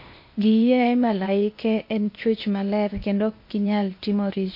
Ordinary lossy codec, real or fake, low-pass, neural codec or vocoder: MP3, 32 kbps; fake; 5.4 kHz; codec, 16 kHz, 0.8 kbps, ZipCodec